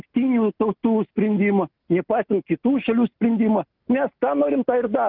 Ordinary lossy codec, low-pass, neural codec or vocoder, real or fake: Opus, 24 kbps; 5.4 kHz; none; real